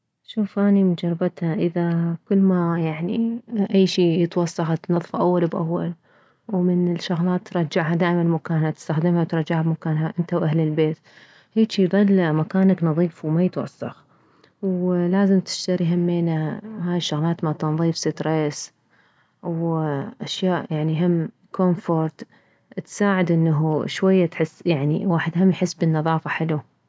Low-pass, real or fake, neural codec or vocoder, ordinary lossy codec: none; real; none; none